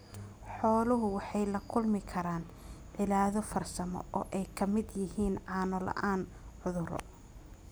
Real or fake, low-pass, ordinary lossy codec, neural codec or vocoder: real; none; none; none